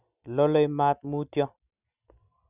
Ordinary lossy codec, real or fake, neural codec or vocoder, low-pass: none; real; none; 3.6 kHz